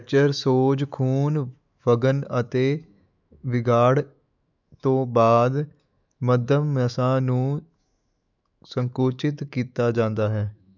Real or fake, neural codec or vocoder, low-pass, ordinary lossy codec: fake; autoencoder, 48 kHz, 128 numbers a frame, DAC-VAE, trained on Japanese speech; 7.2 kHz; none